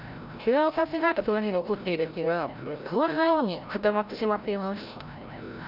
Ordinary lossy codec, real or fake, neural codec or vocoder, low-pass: none; fake; codec, 16 kHz, 0.5 kbps, FreqCodec, larger model; 5.4 kHz